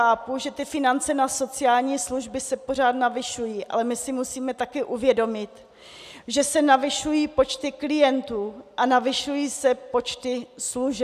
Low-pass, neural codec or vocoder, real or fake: 14.4 kHz; none; real